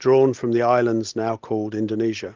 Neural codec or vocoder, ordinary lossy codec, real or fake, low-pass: none; Opus, 16 kbps; real; 7.2 kHz